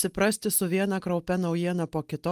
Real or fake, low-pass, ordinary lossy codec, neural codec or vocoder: real; 14.4 kHz; Opus, 32 kbps; none